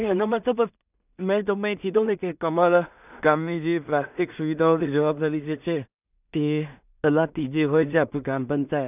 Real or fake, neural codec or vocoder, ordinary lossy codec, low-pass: fake; codec, 16 kHz in and 24 kHz out, 0.4 kbps, LongCat-Audio-Codec, two codebook decoder; none; 3.6 kHz